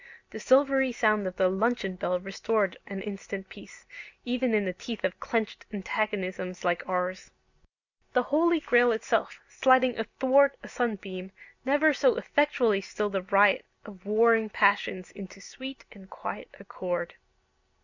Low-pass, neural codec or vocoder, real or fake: 7.2 kHz; none; real